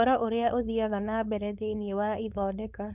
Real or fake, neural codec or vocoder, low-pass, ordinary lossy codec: fake; codec, 16 kHz, 4.8 kbps, FACodec; 3.6 kHz; none